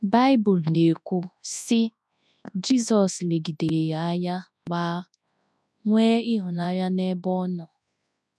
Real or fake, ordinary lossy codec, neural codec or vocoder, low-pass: fake; none; codec, 24 kHz, 0.9 kbps, WavTokenizer, large speech release; none